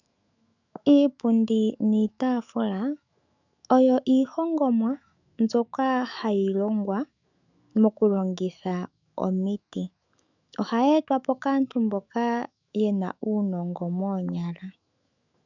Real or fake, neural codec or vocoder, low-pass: fake; autoencoder, 48 kHz, 128 numbers a frame, DAC-VAE, trained on Japanese speech; 7.2 kHz